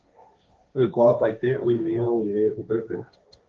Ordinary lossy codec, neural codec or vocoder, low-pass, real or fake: Opus, 24 kbps; codec, 16 kHz, 1.1 kbps, Voila-Tokenizer; 7.2 kHz; fake